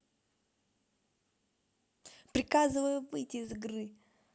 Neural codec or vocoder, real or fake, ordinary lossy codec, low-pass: none; real; none; none